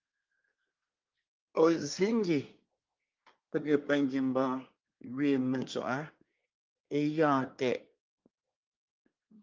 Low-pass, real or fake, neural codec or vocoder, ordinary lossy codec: 7.2 kHz; fake; codec, 24 kHz, 1 kbps, SNAC; Opus, 24 kbps